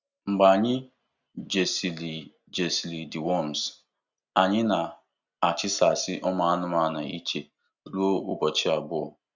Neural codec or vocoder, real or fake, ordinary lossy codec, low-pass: none; real; Opus, 64 kbps; 7.2 kHz